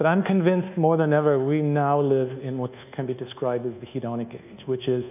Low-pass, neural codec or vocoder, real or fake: 3.6 kHz; codec, 24 kHz, 1.2 kbps, DualCodec; fake